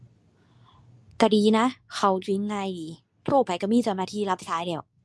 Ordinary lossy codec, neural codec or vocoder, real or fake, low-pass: none; codec, 24 kHz, 0.9 kbps, WavTokenizer, medium speech release version 2; fake; none